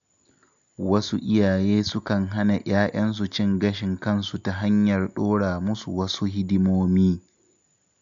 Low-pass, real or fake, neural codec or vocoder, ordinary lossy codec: 7.2 kHz; real; none; none